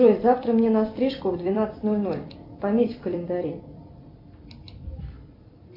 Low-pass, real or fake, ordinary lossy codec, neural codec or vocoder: 5.4 kHz; fake; AAC, 32 kbps; vocoder, 44.1 kHz, 128 mel bands every 256 samples, BigVGAN v2